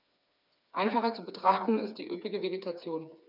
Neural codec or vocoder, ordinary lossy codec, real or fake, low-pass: codec, 16 kHz, 4 kbps, FreqCodec, smaller model; none; fake; 5.4 kHz